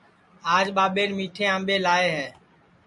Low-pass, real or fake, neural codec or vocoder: 10.8 kHz; real; none